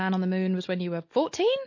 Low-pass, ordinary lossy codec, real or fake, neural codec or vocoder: 7.2 kHz; MP3, 32 kbps; real; none